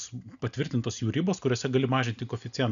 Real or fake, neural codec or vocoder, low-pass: real; none; 7.2 kHz